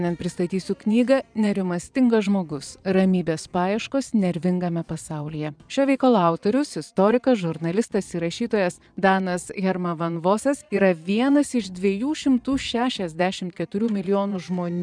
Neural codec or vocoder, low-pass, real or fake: vocoder, 22.05 kHz, 80 mel bands, Vocos; 9.9 kHz; fake